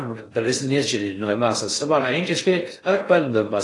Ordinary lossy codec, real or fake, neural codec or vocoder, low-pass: AAC, 48 kbps; fake; codec, 16 kHz in and 24 kHz out, 0.6 kbps, FocalCodec, streaming, 4096 codes; 10.8 kHz